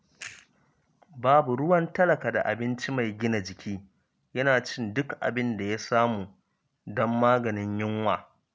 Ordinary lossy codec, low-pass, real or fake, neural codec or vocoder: none; none; real; none